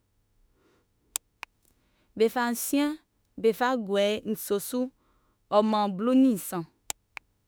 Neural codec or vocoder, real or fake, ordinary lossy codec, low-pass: autoencoder, 48 kHz, 32 numbers a frame, DAC-VAE, trained on Japanese speech; fake; none; none